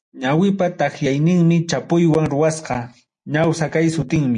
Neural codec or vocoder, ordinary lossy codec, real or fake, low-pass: none; MP3, 48 kbps; real; 9.9 kHz